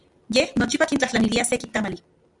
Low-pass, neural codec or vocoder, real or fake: 10.8 kHz; none; real